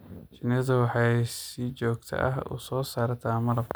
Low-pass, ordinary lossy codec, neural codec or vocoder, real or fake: none; none; none; real